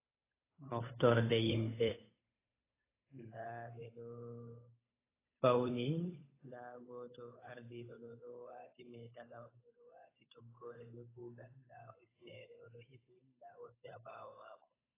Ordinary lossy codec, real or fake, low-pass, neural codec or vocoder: AAC, 16 kbps; fake; 3.6 kHz; codec, 16 kHz, 8 kbps, FunCodec, trained on Chinese and English, 25 frames a second